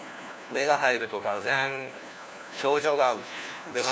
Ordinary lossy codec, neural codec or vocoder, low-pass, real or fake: none; codec, 16 kHz, 1 kbps, FunCodec, trained on LibriTTS, 50 frames a second; none; fake